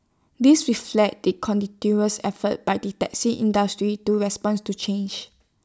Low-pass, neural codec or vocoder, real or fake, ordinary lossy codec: none; none; real; none